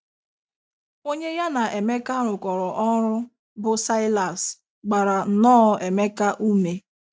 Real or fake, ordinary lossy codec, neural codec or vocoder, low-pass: real; none; none; none